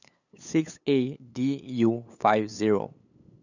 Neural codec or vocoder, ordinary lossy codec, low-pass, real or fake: codec, 16 kHz, 8 kbps, FunCodec, trained on LibriTTS, 25 frames a second; none; 7.2 kHz; fake